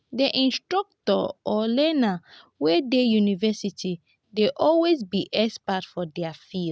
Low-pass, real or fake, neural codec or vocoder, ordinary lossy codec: none; real; none; none